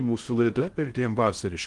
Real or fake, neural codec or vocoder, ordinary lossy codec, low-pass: fake; codec, 16 kHz in and 24 kHz out, 0.6 kbps, FocalCodec, streaming, 4096 codes; Opus, 24 kbps; 10.8 kHz